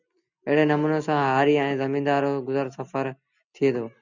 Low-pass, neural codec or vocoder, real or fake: 7.2 kHz; none; real